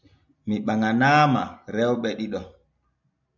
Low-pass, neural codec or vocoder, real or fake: 7.2 kHz; none; real